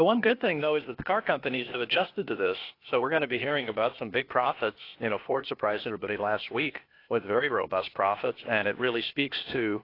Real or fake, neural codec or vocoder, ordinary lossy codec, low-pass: fake; codec, 16 kHz, 0.8 kbps, ZipCodec; AAC, 32 kbps; 5.4 kHz